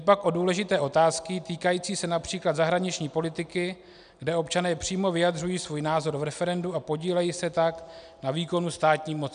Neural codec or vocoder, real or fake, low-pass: none; real; 9.9 kHz